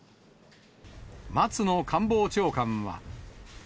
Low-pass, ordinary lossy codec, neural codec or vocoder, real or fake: none; none; none; real